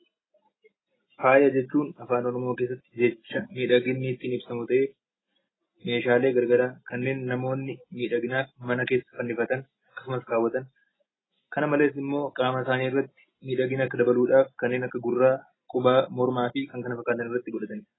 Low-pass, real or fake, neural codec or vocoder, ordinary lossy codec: 7.2 kHz; real; none; AAC, 16 kbps